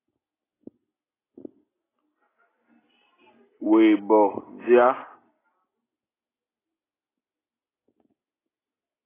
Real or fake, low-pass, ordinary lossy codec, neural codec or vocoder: real; 3.6 kHz; AAC, 16 kbps; none